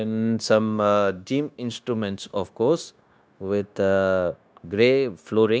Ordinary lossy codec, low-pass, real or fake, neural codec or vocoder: none; none; fake; codec, 16 kHz, 0.9 kbps, LongCat-Audio-Codec